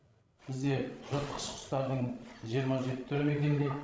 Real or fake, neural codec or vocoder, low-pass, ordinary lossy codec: fake; codec, 16 kHz, 16 kbps, FreqCodec, larger model; none; none